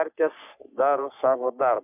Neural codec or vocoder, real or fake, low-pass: codec, 44.1 kHz, 3.4 kbps, Pupu-Codec; fake; 3.6 kHz